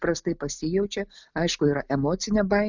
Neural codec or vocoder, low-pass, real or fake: none; 7.2 kHz; real